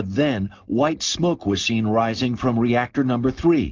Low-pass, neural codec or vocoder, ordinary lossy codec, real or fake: 7.2 kHz; none; Opus, 32 kbps; real